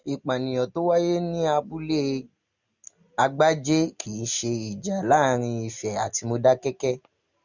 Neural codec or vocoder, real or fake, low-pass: none; real; 7.2 kHz